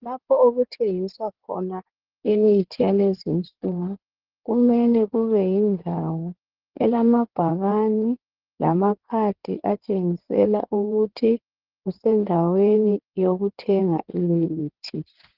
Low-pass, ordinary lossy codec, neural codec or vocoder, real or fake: 5.4 kHz; Opus, 16 kbps; codec, 16 kHz in and 24 kHz out, 2.2 kbps, FireRedTTS-2 codec; fake